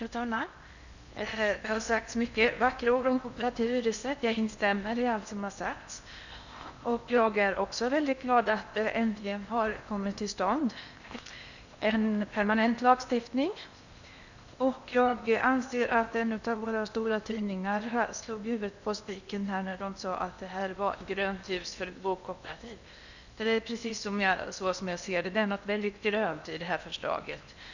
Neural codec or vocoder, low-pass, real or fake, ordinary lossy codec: codec, 16 kHz in and 24 kHz out, 0.8 kbps, FocalCodec, streaming, 65536 codes; 7.2 kHz; fake; none